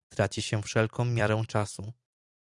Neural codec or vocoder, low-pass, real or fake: vocoder, 44.1 kHz, 128 mel bands every 256 samples, BigVGAN v2; 10.8 kHz; fake